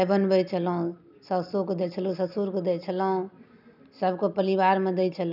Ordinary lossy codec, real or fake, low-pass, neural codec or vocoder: none; real; 5.4 kHz; none